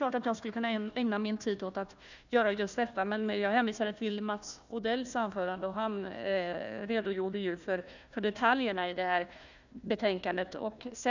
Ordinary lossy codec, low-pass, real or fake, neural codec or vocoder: none; 7.2 kHz; fake; codec, 16 kHz, 1 kbps, FunCodec, trained on Chinese and English, 50 frames a second